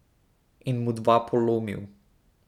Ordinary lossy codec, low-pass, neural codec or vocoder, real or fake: none; 19.8 kHz; none; real